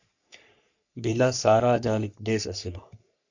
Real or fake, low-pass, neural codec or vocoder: fake; 7.2 kHz; codec, 44.1 kHz, 3.4 kbps, Pupu-Codec